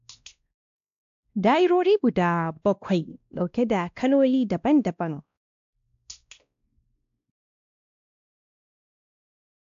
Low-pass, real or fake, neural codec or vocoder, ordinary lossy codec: 7.2 kHz; fake; codec, 16 kHz, 1 kbps, X-Codec, WavLM features, trained on Multilingual LibriSpeech; AAC, 64 kbps